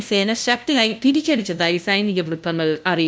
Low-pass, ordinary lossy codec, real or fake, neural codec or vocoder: none; none; fake; codec, 16 kHz, 0.5 kbps, FunCodec, trained on LibriTTS, 25 frames a second